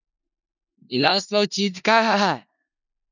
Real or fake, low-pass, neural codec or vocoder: fake; 7.2 kHz; codec, 16 kHz in and 24 kHz out, 0.4 kbps, LongCat-Audio-Codec, four codebook decoder